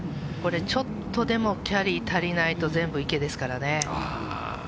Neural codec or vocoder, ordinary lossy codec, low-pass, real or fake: none; none; none; real